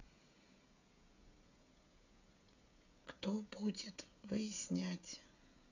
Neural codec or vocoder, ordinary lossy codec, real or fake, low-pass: codec, 16 kHz, 16 kbps, FreqCodec, smaller model; none; fake; 7.2 kHz